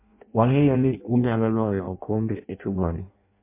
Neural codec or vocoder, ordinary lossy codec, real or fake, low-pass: codec, 16 kHz in and 24 kHz out, 0.6 kbps, FireRedTTS-2 codec; MP3, 24 kbps; fake; 3.6 kHz